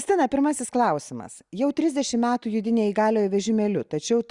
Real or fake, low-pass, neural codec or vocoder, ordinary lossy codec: real; 10.8 kHz; none; Opus, 64 kbps